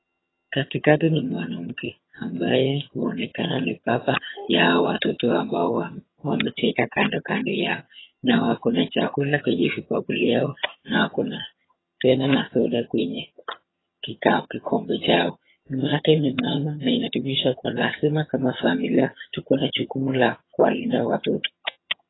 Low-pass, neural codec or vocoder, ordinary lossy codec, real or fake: 7.2 kHz; vocoder, 22.05 kHz, 80 mel bands, HiFi-GAN; AAC, 16 kbps; fake